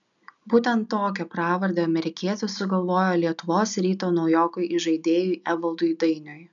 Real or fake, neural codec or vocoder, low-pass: real; none; 7.2 kHz